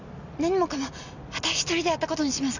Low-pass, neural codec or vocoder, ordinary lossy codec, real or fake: 7.2 kHz; none; none; real